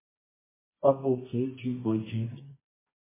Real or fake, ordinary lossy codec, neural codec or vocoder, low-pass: fake; MP3, 16 kbps; codec, 24 kHz, 0.9 kbps, WavTokenizer, medium music audio release; 3.6 kHz